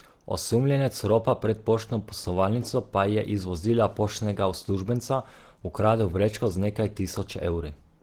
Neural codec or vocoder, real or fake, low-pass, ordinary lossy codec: none; real; 19.8 kHz; Opus, 16 kbps